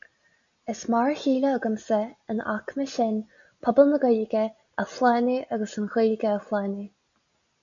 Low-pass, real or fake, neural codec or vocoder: 7.2 kHz; real; none